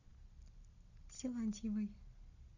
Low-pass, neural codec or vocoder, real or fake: 7.2 kHz; none; real